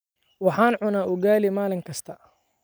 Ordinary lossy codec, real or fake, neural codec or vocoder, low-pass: none; real; none; none